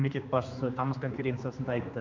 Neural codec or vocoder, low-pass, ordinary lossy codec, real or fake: codec, 16 kHz, 2 kbps, X-Codec, HuBERT features, trained on general audio; 7.2 kHz; MP3, 64 kbps; fake